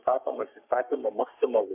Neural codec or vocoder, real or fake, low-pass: codec, 44.1 kHz, 3.4 kbps, Pupu-Codec; fake; 3.6 kHz